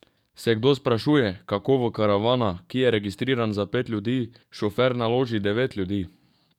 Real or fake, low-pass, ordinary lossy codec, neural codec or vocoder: fake; 19.8 kHz; none; codec, 44.1 kHz, 7.8 kbps, DAC